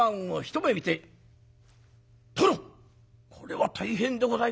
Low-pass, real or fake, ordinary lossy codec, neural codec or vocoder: none; real; none; none